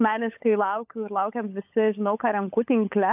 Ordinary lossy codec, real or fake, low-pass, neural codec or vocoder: AAC, 32 kbps; fake; 3.6 kHz; codec, 16 kHz, 16 kbps, FunCodec, trained on LibriTTS, 50 frames a second